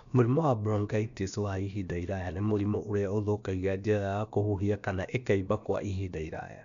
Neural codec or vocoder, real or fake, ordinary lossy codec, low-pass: codec, 16 kHz, about 1 kbps, DyCAST, with the encoder's durations; fake; none; 7.2 kHz